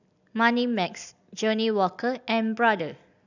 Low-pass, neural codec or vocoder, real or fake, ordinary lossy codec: 7.2 kHz; none; real; none